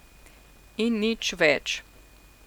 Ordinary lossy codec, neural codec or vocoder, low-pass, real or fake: none; none; 19.8 kHz; real